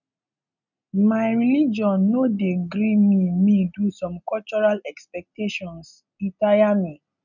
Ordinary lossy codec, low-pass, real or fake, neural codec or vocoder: none; 7.2 kHz; real; none